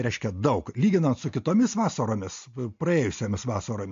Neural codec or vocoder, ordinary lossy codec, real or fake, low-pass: none; MP3, 48 kbps; real; 7.2 kHz